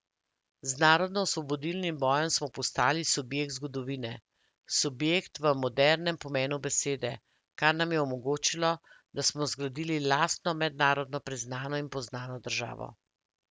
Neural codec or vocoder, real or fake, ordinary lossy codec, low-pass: none; real; none; none